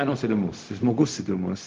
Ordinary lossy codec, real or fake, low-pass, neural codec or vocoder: Opus, 16 kbps; fake; 7.2 kHz; codec, 16 kHz, 0.4 kbps, LongCat-Audio-Codec